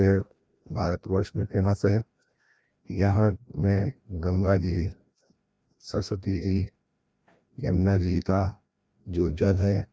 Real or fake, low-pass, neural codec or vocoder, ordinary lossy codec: fake; none; codec, 16 kHz, 1 kbps, FreqCodec, larger model; none